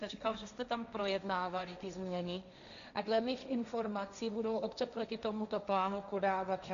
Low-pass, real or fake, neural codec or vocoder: 7.2 kHz; fake; codec, 16 kHz, 1.1 kbps, Voila-Tokenizer